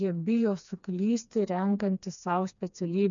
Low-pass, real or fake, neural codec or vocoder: 7.2 kHz; fake; codec, 16 kHz, 2 kbps, FreqCodec, smaller model